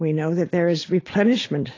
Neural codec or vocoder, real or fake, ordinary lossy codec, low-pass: none; real; AAC, 32 kbps; 7.2 kHz